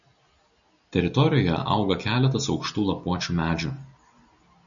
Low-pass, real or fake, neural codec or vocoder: 7.2 kHz; real; none